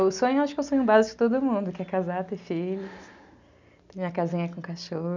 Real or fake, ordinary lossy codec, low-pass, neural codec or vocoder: real; none; 7.2 kHz; none